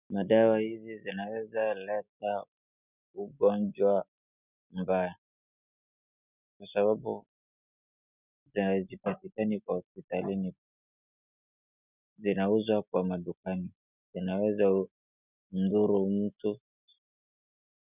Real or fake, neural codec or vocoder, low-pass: real; none; 3.6 kHz